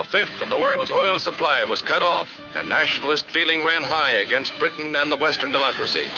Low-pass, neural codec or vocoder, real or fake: 7.2 kHz; codec, 16 kHz, 2 kbps, FunCodec, trained on Chinese and English, 25 frames a second; fake